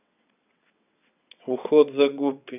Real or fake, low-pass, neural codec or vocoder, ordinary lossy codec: fake; 3.6 kHz; vocoder, 22.05 kHz, 80 mel bands, WaveNeXt; none